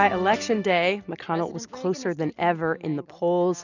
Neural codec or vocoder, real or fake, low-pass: none; real; 7.2 kHz